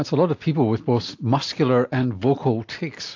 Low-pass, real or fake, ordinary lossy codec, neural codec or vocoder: 7.2 kHz; real; AAC, 48 kbps; none